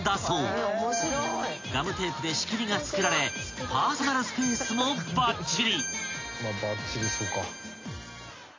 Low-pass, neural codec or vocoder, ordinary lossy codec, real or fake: 7.2 kHz; none; AAC, 32 kbps; real